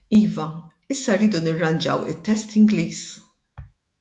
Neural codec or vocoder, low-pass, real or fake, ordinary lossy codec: autoencoder, 48 kHz, 128 numbers a frame, DAC-VAE, trained on Japanese speech; 10.8 kHz; fake; AAC, 64 kbps